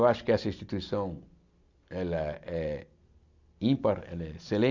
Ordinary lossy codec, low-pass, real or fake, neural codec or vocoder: none; 7.2 kHz; real; none